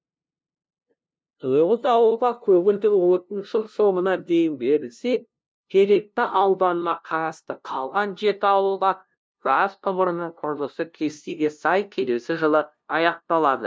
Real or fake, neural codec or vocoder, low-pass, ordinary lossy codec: fake; codec, 16 kHz, 0.5 kbps, FunCodec, trained on LibriTTS, 25 frames a second; none; none